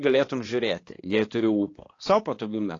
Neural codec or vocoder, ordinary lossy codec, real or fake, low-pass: codec, 16 kHz, 2 kbps, X-Codec, HuBERT features, trained on balanced general audio; AAC, 32 kbps; fake; 7.2 kHz